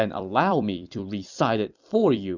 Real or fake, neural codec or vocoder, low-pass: fake; vocoder, 44.1 kHz, 128 mel bands every 256 samples, BigVGAN v2; 7.2 kHz